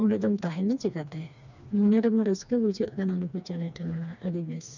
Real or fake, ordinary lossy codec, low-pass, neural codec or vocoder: fake; none; 7.2 kHz; codec, 16 kHz, 2 kbps, FreqCodec, smaller model